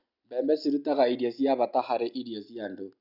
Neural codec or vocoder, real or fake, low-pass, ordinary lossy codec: none; real; 5.4 kHz; none